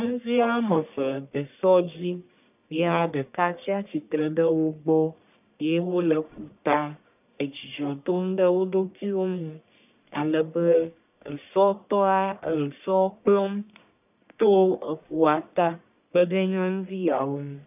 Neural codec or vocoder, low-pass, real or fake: codec, 44.1 kHz, 1.7 kbps, Pupu-Codec; 3.6 kHz; fake